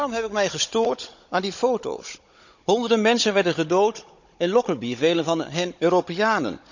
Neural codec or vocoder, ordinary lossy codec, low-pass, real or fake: codec, 16 kHz, 16 kbps, FunCodec, trained on Chinese and English, 50 frames a second; none; 7.2 kHz; fake